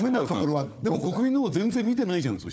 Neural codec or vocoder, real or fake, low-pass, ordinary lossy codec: codec, 16 kHz, 4 kbps, FunCodec, trained on Chinese and English, 50 frames a second; fake; none; none